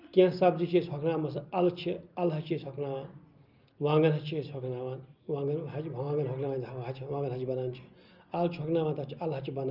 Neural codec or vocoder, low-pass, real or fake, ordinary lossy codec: none; 5.4 kHz; real; Opus, 24 kbps